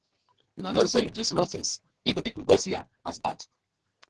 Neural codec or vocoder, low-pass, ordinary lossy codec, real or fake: codec, 32 kHz, 1.9 kbps, SNAC; 10.8 kHz; Opus, 16 kbps; fake